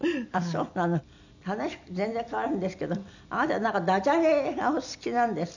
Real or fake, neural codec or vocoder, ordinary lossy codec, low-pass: real; none; none; 7.2 kHz